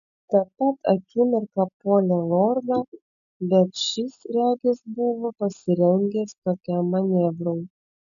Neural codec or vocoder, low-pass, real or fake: none; 7.2 kHz; real